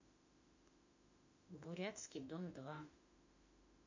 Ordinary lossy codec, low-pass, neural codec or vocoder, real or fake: AAC, 48 kbps; 7.2 kHz; autoencoder, 48 kHz, 32 numbers a frame, DAC-VAE, trained on Japanese speech; fake